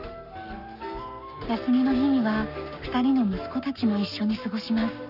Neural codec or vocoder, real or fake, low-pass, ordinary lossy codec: codec, 44.1 kHz, 7.8 kbps, Pupu-Codec; fake; 5.4 kHz; none